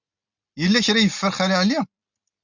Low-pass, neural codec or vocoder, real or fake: 7.2 kHz; none; real